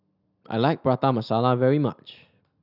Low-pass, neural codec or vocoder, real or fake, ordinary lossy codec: 5.4 kHz; none; real; none